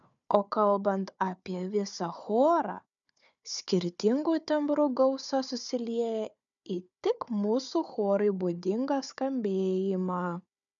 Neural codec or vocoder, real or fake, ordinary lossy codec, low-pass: codec, 16 kHz, 4 kbps, FunCodec, trained on Chinese and English, 50 frames a second; fake; MP3, 96 kbps; 7.2 kHz